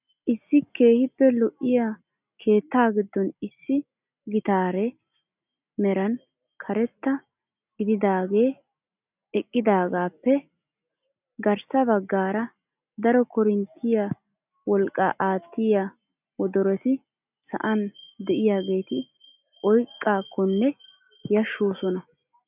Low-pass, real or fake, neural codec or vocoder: 3.6 kHz; real; none